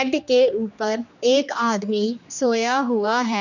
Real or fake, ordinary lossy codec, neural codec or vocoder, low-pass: fake; none; codec, 16 kHz, 2 kbps, X-Codec, HuBERT features, trained on general audio; 7.2 kHz